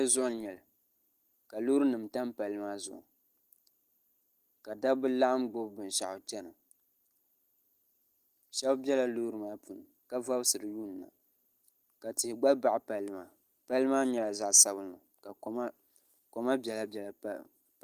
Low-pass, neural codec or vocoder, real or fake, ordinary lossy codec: 14.4 kHz; none; real; Opus, 24 kbps